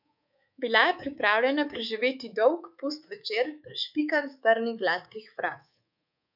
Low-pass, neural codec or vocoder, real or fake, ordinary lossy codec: 5.4 kHz; codec, 24 kHz, 3.1 kbps, DualCodec; fake; none